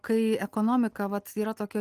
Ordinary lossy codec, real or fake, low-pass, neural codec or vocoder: Opus, 24 kbps; real; 14.4 kHz; none